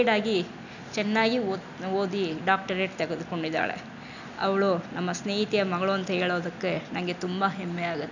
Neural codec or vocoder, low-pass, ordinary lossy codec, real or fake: none; 7.2 kHz; none; real